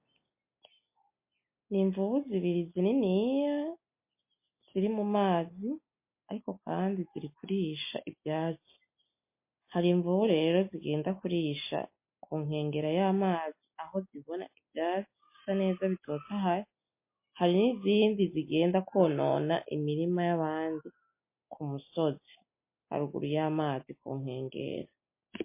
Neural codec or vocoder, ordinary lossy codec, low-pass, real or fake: none; MP3, 24 kbps; 3.6 kHz; real